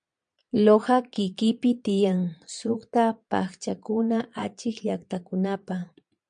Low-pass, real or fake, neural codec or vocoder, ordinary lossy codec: 9.9 kHz; fake; vocoder, 22.05 kHz, 80 mel bands, Vocos; MP3, 64 kbps